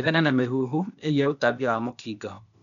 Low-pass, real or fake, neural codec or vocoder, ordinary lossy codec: 7.2 kHz; fake; codec, 16 kHz, 0.8 kbps, ZipCodec; none